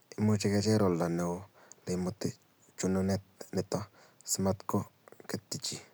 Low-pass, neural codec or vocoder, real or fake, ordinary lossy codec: none; none; real; none